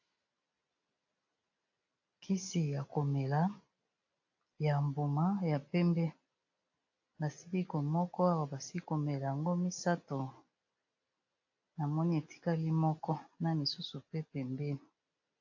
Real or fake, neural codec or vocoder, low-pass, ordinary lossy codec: real; none; 7.2 kHz; AAC, 48 kbps